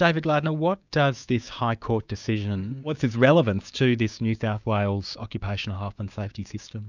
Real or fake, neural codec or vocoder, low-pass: fake; codec, 16 kHz, 6 kbps, DAC; 7.2 kHz